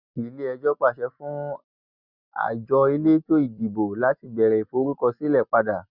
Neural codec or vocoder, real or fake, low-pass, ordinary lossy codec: none; real; 5.4 kHz; none